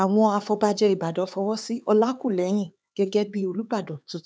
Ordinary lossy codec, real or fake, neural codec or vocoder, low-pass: none; fake; codec, 16 kHz, 4 kbps, X-Codec, HuBERT features, trained on LibriSpeech; none